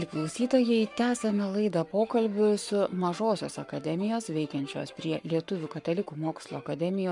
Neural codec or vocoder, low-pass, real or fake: codec, 44.1 kHz, 7.8 kbps, Pupu-Codec; 10.8 kHz; fake